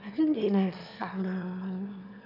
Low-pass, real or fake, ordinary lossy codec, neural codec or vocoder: 5.4 kHz; fake; none; autoencoder, 22.05 kHz, a latent of 192 numbers a frame, VITS, trained on one speaker